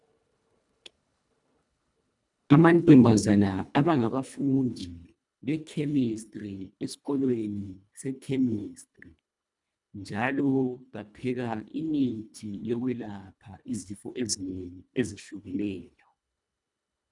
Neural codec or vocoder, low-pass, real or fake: codec, 24 kHz, 1.5 kbps, HILCodec; 10.8 kHz; fake